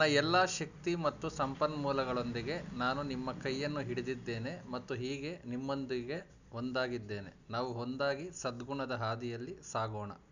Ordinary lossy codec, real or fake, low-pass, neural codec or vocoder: none; real; 7.2 kHz; none